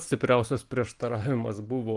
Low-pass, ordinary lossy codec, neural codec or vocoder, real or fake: 10.8 kHz; Opus, 24 kbps; none; real